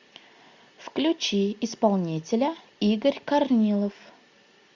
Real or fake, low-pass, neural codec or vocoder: real; 7.2 kHz; none